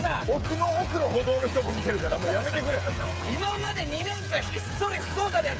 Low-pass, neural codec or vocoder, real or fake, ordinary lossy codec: none; codec, 16 kHz, 8 kbps, FreqCodec, smaller model; fake; none